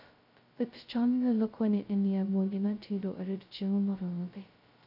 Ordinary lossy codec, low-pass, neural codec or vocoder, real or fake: none; 5.4 kHz; codec, 16 kHz, 0.2 kbps, FocalCodec; fake